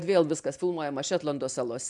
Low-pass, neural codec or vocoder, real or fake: 10.8 kHz; none; real